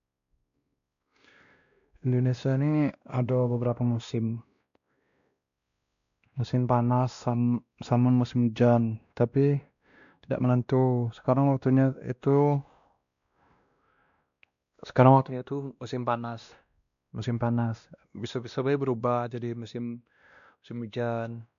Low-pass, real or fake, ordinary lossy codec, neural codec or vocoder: 7.2 kHz; fake; none; codec, 16 kHz, 1 kbps, X-Codec, WavLM features, trained on Multilingual LibriSpeech